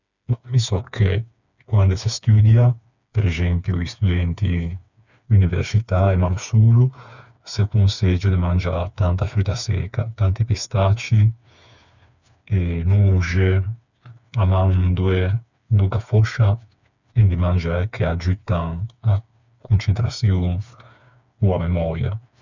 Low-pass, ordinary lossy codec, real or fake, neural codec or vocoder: 7.2 kHz; none; fake; codec, 16 kHz, 4 kbps, FreqCodec, smaller model